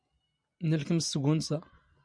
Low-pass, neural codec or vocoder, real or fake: 9.9 kHz; none; real